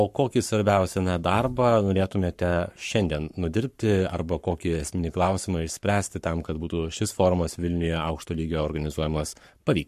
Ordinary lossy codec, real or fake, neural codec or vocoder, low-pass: MP3, 64 kbps; fake; codec, 44.1 kHz, 7.8 kbps, Pupu-Codec; 14.4 kHz